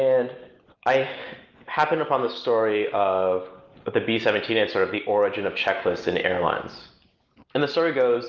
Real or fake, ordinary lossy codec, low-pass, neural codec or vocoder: real; Opus, 24 kbps; 7.2 kHz; none